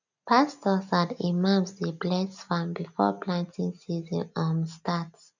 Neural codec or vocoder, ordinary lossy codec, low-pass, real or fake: none; none; 7.2 kHz; real